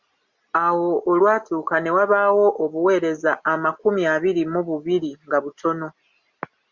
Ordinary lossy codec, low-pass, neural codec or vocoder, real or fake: Opus, 64 kbps; 7.2 kHz; none; real